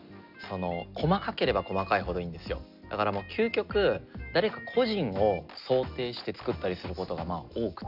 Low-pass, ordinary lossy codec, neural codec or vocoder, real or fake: 5.4 kHz; none; none; real